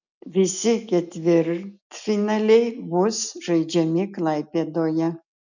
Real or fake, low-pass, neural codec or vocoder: real; 7.2 kHz; none